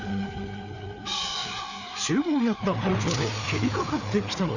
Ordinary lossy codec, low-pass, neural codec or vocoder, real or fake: none; 7.2 kHz; codec, 16 kHz, 4 kbps, FreqCodec, larger model; fake